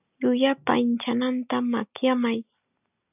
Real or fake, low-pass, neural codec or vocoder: real; 3.6 kHz; none